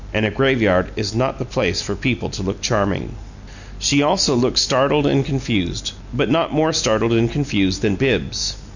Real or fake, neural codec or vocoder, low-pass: real; none; 7.2 kHz